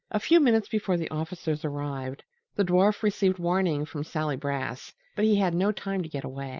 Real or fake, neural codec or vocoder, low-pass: real; none; 7.2 kHz